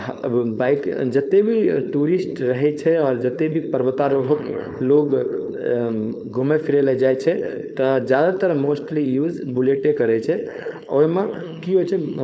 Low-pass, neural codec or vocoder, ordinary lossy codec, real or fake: none; codec, 16 kHz, 4.8 kbps, FACodec; none; fake